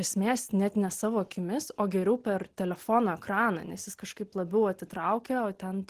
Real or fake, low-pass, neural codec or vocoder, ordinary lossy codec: real; 14.4 kHz; none; Opus, 16 kbps